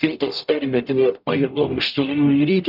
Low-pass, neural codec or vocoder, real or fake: 5.4 kHz; codec, 44.1 kHz, 0.9 kbps, DAC; fake